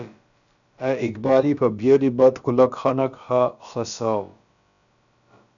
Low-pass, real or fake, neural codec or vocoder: 7.2 kHz; fake; codec, 16 kHz, about 1 kbps, DyCAST, with the encoder's durations